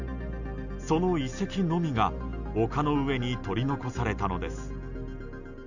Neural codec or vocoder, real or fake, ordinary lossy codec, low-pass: none; real; none; 7.2 kHz